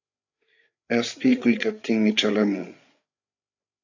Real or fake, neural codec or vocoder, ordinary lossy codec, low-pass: fake; codec, 16 kHz, 8 kbps, FreqCodec, larger model; AAC, 48 kbps; 7.2 kHz